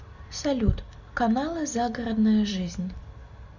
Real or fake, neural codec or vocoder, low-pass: real; none; 7.2 kHz